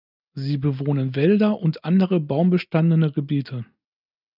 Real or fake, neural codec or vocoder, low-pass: real; none; 5.4 kHz